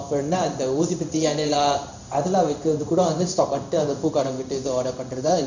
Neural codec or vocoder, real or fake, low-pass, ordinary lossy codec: codec, 16 kHz in and 24 kHz out, 1 kbps, XY-Tokenizer; fake; 7.2 kHz; none